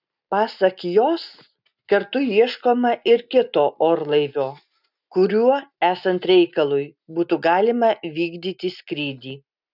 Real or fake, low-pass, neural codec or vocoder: real; 5.4 kHz; none